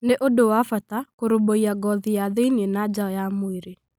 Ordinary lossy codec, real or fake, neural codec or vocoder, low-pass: none; real; none; none